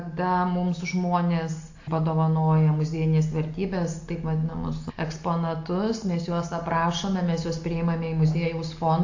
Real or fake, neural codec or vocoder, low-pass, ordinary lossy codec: real; none; 7.2 kHz; AAC, 48 kbps